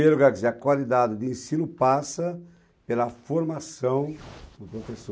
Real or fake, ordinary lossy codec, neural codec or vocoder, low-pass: real; none; none; none